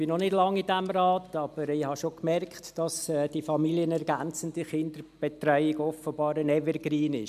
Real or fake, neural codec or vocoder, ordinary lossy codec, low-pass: real; none; MP3, 96 kbps; 14.4 kHz